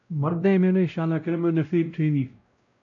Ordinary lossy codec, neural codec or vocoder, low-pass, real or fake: AAC, 48 kbps; codec, 16 kHz, 0.5 kbps, X-Codec, WavLM features, trained on Multilingual LibriSpeech; 7.2 kHz; fake